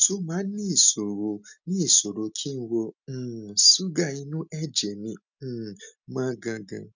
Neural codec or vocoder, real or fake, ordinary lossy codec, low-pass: none; real; none; 7.2 kHz